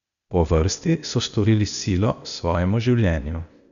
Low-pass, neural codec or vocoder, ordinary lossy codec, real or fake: 7.2 kHz; codec, 16 kHz, 0.8 kbps, ZipCodec; none; fake